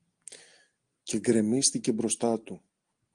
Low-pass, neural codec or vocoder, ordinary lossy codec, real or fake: 9.9 kHz; none; Opus, 24 kbps; real